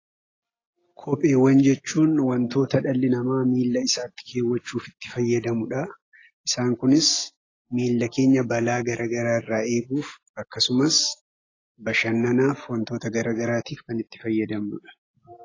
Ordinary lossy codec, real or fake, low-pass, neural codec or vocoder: AAC, 32 kbps; real; 7.2 kHz; none